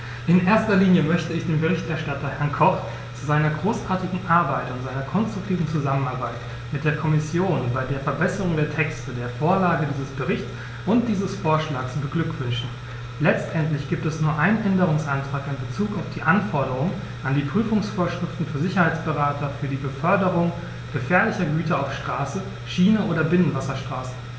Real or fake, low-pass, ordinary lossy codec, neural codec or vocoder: real; none; none; none